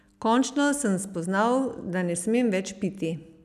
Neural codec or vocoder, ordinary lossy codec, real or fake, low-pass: none; none; real; 14.4 kHz